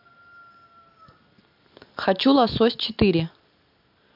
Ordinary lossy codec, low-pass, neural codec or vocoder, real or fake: MP3, 48 kbps; 5.4 kHz; none; real